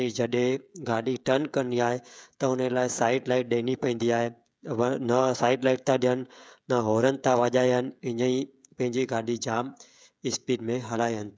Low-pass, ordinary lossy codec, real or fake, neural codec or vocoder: none; none; fake; codec, 16 kHz, 16 kbps, FreqCodec, smaller model